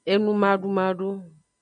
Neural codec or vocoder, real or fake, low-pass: none; real; 9.9 kHz